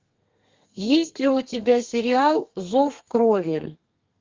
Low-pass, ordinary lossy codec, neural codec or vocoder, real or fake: 7.2 kHz; Opus, 32 kbps; codec, 32 kHz, 1.9 kbps, SNAC; fake